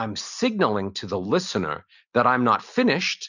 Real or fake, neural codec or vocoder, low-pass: real; none; 7.2 kHz